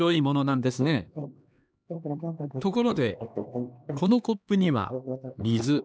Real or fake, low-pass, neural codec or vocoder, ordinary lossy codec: fake; none; codec, 16 kHz, 2 kbps, X-Codec, HuBERT features, trained on LibriSpeech; none